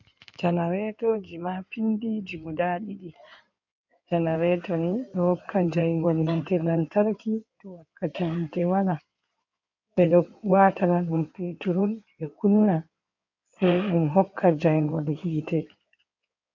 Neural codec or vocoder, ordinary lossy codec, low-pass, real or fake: codec, 16 kHz in and 24 kHz out, 2.2 kbps, FireRedTTS-2 codec; MP3, 64 kbps; 7.2 kHz; fake